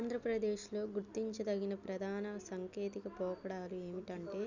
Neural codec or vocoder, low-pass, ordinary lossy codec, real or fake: none; 7.2 kHz; none; real